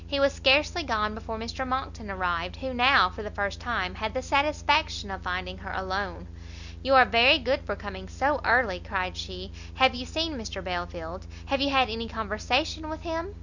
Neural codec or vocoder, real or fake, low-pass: none; real; 7.2 kHz